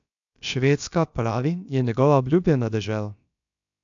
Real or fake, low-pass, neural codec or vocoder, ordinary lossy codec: fake; 7.2 kHz; codec, 16 kHz, about 1 kbps, DyCAST, with the encoder's durations; AAC, 64 kbps